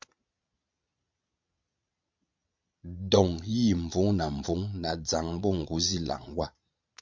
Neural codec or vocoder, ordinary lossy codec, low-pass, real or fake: vocoder, 22.05 kHz, 80 mel bands, Vocos; MP3, 64 kbps; 7.2 kHz; fake